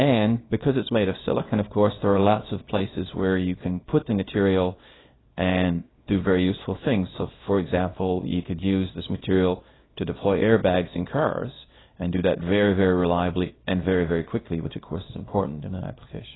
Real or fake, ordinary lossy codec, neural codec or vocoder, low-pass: fake; AAC, 16 kbps; codec, 24 kHz, 0.9 kbps, WavTokenizer, small release; 7.2 kHz